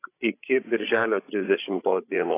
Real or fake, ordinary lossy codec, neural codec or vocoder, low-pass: fake; AAC, 24 kbps; codec, 16 kHz, 16 kbps, FreqCodec, larger model; 3.6 kHz